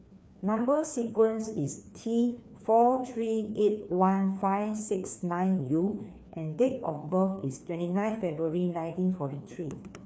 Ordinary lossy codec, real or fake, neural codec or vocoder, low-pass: none; fake; codec, 16 kHz, 2 kbps, FreqCodec, larger model; none